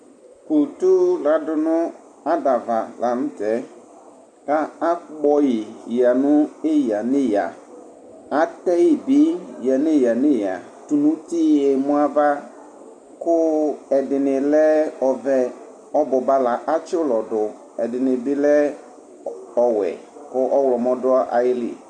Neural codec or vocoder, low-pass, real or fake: none; 9.9 kHz; real